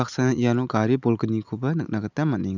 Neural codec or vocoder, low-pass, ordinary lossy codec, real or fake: none; 7.2 kHz; none; real